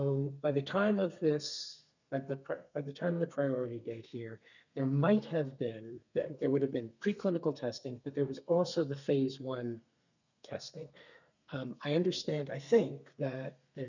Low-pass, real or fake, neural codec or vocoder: 7.2 kHz; fake; codec, 32 kHz, 1.9 kbps, SNAC